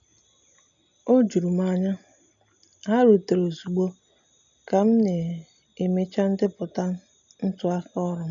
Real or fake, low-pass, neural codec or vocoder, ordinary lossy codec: real; 7.2 kHz; none; none